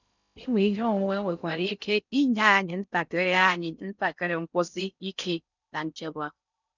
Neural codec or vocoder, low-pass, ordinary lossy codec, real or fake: codec, 16 kHz in and 24 kHz out, 0.6 kbps, FocalCodec, streaming, 2048 codes; 7.2 kHz; none; fake